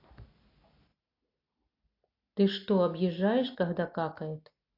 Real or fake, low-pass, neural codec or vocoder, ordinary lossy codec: real; 5.4 kHz; none; AAC, 32 kbps